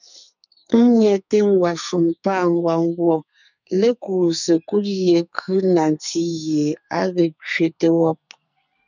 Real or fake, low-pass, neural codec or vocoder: fake; 7.2 kHz; codec, 44.1 kHz, 2.6 kbps, SNAC